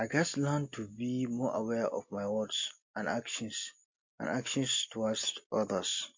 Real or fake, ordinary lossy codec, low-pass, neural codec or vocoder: real; MP3, 48 kbps; 7.2 kHz; none